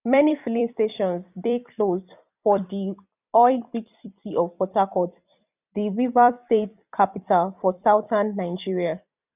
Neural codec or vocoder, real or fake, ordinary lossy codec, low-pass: vocoder, 44.1 kHz, 128 mel bands every 256 samples, BigVGAN v2; fake; none; 3.6 kHz